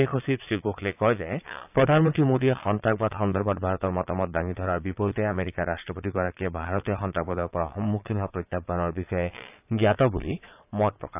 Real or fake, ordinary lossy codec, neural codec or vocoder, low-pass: fake; AAC, 32 kbps; vocoder, 22.05 kHz, 80 mel bands, Vocos; 3.6 kHz